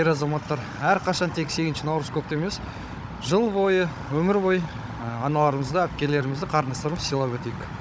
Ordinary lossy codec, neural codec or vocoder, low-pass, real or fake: none; codec, 16 kHz, 16 kbps, FunCodec, trained on Chinese and English, 50 frames a second; none; fake